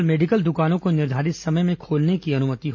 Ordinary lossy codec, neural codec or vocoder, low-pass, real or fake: MP3, 64 kbps; none; 7.2 kHz; real